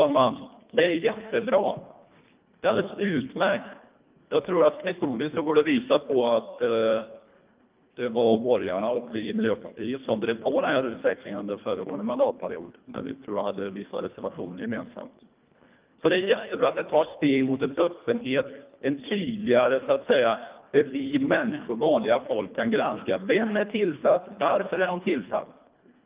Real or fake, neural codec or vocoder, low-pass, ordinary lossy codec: fake; codec, 24 kHz, 1.5 kbps, HILCodec; 3.6 kHz; Opus, 32 kbps